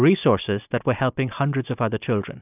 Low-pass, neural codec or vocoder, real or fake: 3.6 kHz; none; real